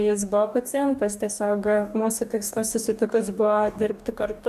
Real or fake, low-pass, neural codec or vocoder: fake; 14.4 kHz; codec, 44.1 kHz, 2.6 kbps, DAC